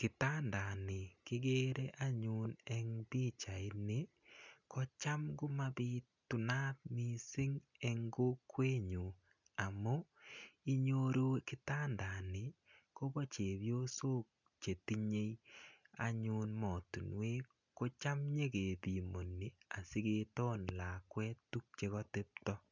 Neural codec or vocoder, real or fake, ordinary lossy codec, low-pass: none; real; none; 7.2 kHz